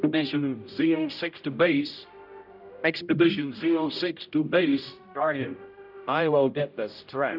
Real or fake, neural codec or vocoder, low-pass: fake; codec, 16 kHz, 0.5 kbps, X-Codec, HuBERT features, trained on general audio; 5.4 kHz